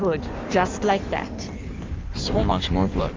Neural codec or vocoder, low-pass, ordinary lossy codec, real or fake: codec, 16 kHz in and 24 kHz out, 1.1 kbps, FireRedTTS-2 codec; 7.2 kHz; Opus, 32 kbps; fake